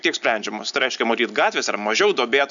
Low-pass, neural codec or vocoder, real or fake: 7.2 kHz; none; real